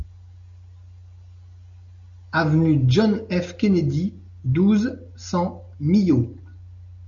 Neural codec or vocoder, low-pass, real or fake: none; 7.2 kHz; real